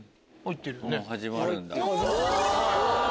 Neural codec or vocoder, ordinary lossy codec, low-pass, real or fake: none; none; none; real